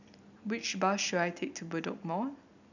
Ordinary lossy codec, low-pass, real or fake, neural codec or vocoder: none; 7.2 kHz; real; none